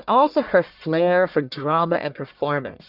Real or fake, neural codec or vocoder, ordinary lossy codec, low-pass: fake; codec, 44.1 kHz, 1.7 kbps, Pupu-Codec; Opus, 64 kbps; 5.4 kHz